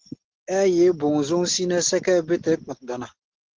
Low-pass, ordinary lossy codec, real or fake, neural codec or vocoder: 7.2 kHz; Opus, 16 kbps; real; none